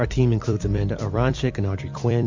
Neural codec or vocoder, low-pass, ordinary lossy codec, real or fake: vocoder, 44.1 kHz, 128 mel bands every 256 samples, BigVGAN v2; 7.2 kHz; MP3, 48 kbps; fake